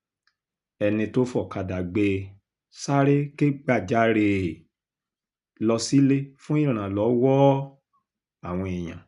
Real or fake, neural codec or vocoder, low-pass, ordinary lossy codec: real; none; 10.8 kHz; none